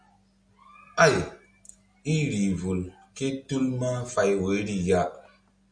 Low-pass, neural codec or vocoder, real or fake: 9.9 kHz; none; real